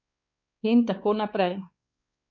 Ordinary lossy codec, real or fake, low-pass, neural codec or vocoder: MP3, 48 kbps; fake; 7.2 kHz; codec, 16 kHz, 4 kbps, X-Codec, WavLM features, trained on Multilingual LibriSpeech